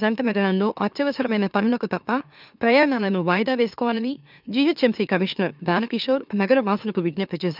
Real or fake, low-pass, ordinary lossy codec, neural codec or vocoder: fake; 5.4 kHz; none; autoencoder, 44.1 kHz, a latent of 192 numbers a frame, MeloTTS